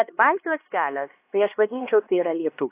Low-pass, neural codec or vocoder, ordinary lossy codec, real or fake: 3.6 kHz; codec, 16 kHz, 2 kbps, X-Codec, HuBERT features, trained on LibriSpeech; AAC, 24 kbps; fake